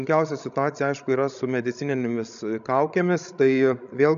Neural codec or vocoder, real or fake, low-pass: codec, 16 kHz, 16 kbps, FreqCodec, larger model; fake; 7.2 kHz